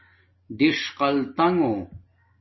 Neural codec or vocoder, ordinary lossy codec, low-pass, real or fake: none; MP3, 24 kbps; 7.2 kHz; real